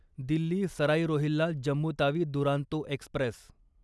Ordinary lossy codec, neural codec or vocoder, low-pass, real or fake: none; none; none; real